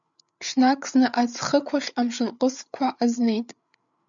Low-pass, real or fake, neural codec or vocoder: 7.2 kHz; fake; codec, 16 kHz, 8 kbps, FreqCodec, larger model